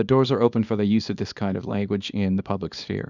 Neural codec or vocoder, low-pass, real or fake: codec, 24 kHz, 0.9 kbps, WavTokenizer, small release; 7.2 kHz; fake